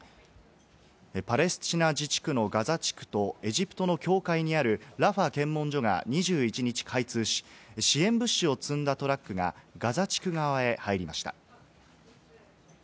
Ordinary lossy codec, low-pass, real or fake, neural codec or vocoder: none; none; real; none